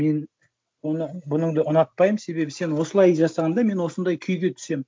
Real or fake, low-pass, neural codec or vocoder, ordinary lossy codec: real; none; none; none